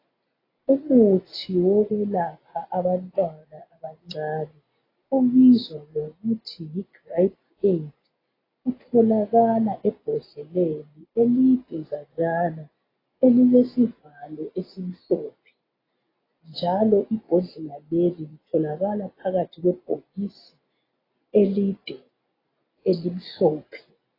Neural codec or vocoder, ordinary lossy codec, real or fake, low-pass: none; AAC, 24 kbps; real; 5.4 kHz